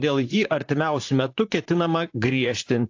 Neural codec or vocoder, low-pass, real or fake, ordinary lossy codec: vocoder, 44.1 kHz, 128 mel bands, Pupu-Vocoder; 7.2 kHz; fake; AAC, 48 kbps